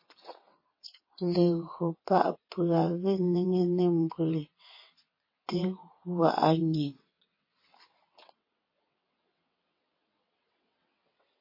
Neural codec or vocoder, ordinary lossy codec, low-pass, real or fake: vocoder, 22.05 kHz, 80 mel bands, WaveNeXt; MP3, 24 kbps; 5.4 kHz; fake